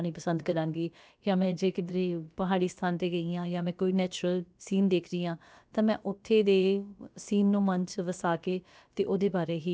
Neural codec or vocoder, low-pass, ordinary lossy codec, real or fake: codec, 16 kHz, about 1 kbps, DyCAST, with the encoder's durations; none; none; fake